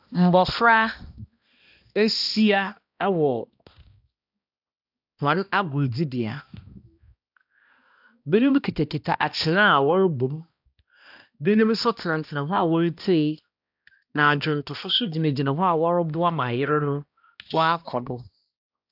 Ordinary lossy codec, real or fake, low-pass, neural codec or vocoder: AAC, 48 kbps; fake; 5.4 kHz; codec, 16 kHz, 1 kbps, X-Codec, HuBERT features, trained on balanced general audio